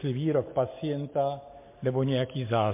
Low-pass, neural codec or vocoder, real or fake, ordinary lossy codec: 3.6 kHz; none; real; MP3, 24 kbps